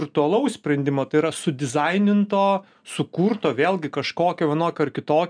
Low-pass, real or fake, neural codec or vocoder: 9.9 kHz; real; none